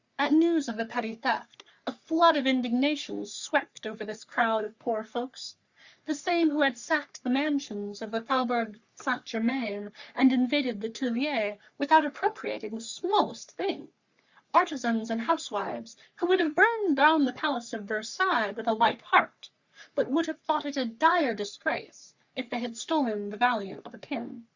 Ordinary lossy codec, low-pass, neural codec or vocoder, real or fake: Opus, 64 kbps; 7.2 kHz; codec, 44.1 kHz, 3.4 kbps, Pupu-Codec; fake